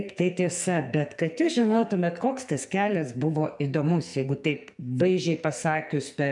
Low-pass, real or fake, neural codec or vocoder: 10.8 kHz; fake; codec, 44.1 kHz, 2.6 kbps, SNAC